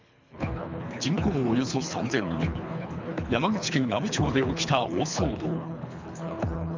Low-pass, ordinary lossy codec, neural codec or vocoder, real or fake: 7.2 kHz; MP3, 64 kbps; codec, 24 kHz, 3 kbps, HILCodec; fake